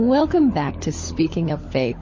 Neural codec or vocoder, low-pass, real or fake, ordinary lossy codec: codec, 16 kHz, 16 kbps, FunCodec, trained on LibriTTS, 50 frames a second; 7.2 kHz; fake; MP3, 32 kbps